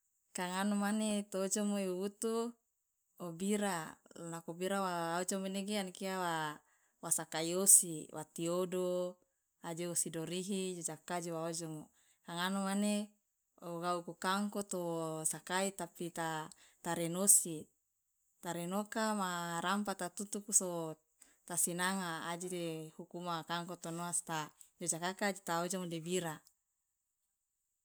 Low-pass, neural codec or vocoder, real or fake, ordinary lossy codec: none; none; real; none